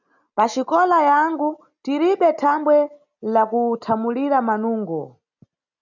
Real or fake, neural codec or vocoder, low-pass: real; none; 7.2 kHz